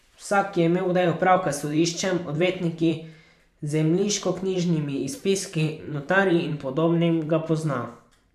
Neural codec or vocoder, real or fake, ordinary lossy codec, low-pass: vocoder, 44.1 kHz, 128 mel bands every 512 samples, BigVGAN v2; fake; MP3, 96 kbps; 14.4 kHz